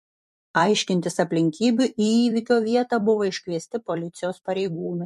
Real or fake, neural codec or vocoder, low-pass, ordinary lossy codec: fake; vocoder, 44.1 kHz, 128 mel bands every 256 samples, BigVGAN v2; 14.4 kHz; MP3, 64 kbps